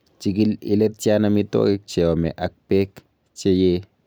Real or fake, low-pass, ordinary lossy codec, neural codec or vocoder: real; none; none; none